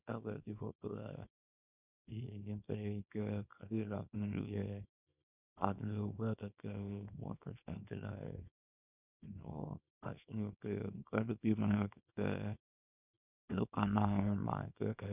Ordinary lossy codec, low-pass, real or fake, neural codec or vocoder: none; 3.6 kHz; fake; codec, 24 kHz, 0.9 kbps, WavTokenizer, small release